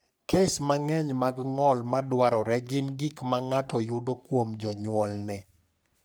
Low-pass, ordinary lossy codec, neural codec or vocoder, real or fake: none; none; codec, 44.1 kHz, 3.4 kbps, Pupu-Codec; fake